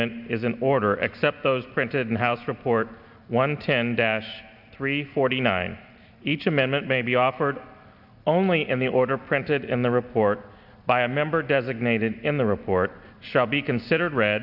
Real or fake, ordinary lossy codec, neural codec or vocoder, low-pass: real; AAC, 48 kbps; none; 5.4 kHz